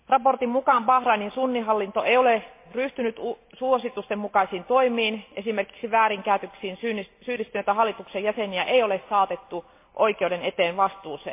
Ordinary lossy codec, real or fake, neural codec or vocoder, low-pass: MP3, 24 kbps; real; none; 3.6 kHz